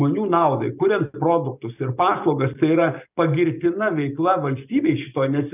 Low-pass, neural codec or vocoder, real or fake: 3.6 kHz; none; real